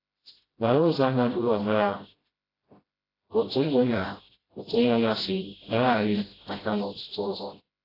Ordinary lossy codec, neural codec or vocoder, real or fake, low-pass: AAC, 24 kbps; codec, 16 kHz, 0.5 kbps, FreqCodec, smaller model; fake; 5.4 kHz